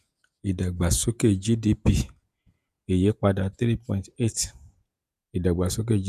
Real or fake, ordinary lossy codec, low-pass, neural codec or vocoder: fake; none; 14.4 kHz; vocoder, 48 kHz, 128 mel bands, Vocos